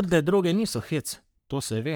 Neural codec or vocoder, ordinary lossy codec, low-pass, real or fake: codec, 44.1 kHz, 3.4 kbps, Pupu-Codec; none; none; fake